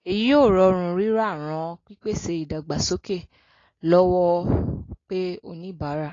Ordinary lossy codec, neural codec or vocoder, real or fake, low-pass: AAC, 32 kbps; none; real; 7.2 kHz